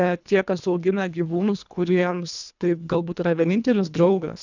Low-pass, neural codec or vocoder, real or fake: 7.2 kHz; codec, 24 kHz, 1.5 kbps, HILCodec; fake